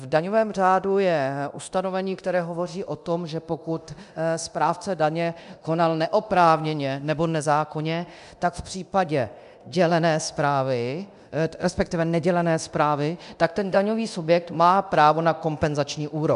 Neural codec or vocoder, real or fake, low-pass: codec, 24 kHz, 0.9 kbps, DualCodec; fake; 10.8 kHz